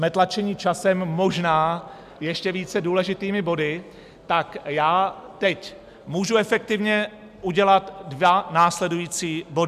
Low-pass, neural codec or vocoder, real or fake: 14.4 kHz; none; real